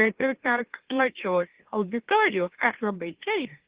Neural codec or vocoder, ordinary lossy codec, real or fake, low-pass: autoencoder, 44.1 kHz, a latent of 192 numbers a frame, MeloTTS; Opus, 16 kbps; fake; 3.6 kHz